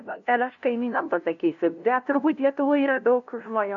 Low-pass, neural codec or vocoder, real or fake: 7.2 kHz; codec, 16 kHz, 0.5 kbps, FunCodec, trained on LibriTTS, 25 frames a second; fake